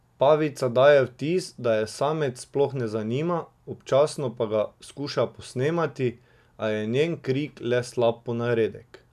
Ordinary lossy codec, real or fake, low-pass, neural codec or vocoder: none; real; 14.4 kHz; none